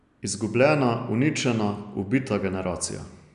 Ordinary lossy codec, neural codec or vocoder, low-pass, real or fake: none; none; 10.8 kHz; real